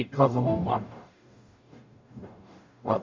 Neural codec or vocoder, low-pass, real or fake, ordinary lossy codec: codec, 44.1 kHz, 0.9 kbps, DAC; 7.2 kHz; fake; MP3, 64 kbps